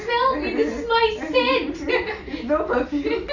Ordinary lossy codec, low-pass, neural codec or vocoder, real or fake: none; 7.2 kHz; none; real